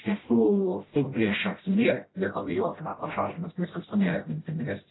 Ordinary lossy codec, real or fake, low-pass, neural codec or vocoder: AAC, 16 kbps; fake; 7.2 kHz; codec, 16 kHz, 0.5 kbps, FreqCodec, smaller model